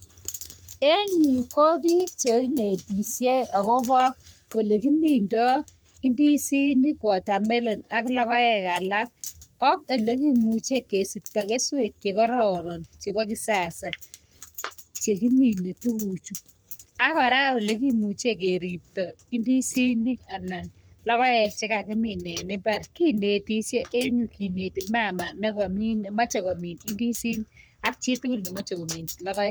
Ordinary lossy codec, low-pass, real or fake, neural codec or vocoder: none; none; fake; codec, 44.1 kHz, 3.4 kbps, Pupu-Codec